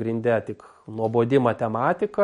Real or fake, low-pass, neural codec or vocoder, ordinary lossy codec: real; 10.8 kHz; none; MP3, 48 kbps